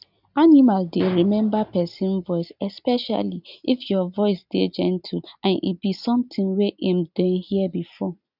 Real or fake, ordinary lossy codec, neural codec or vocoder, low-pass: real; none; none; 5.4 kHz